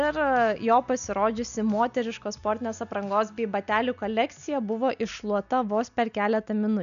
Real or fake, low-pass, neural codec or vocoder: real; 7.2 kHz; none